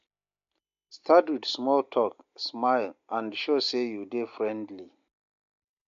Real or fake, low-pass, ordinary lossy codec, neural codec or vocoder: real; 7.2 kHz; MP3, 48 kbps; none